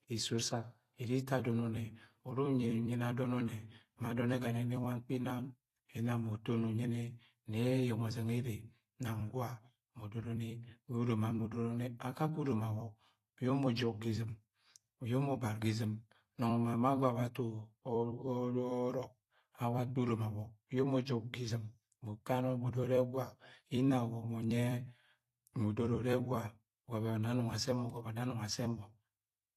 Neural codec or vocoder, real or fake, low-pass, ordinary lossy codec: vocoder, 44.1 kHz, 128 mel bands every 256 samples, BigVGAN v2; fake; 14.4 kHz; AAC, 48 kbps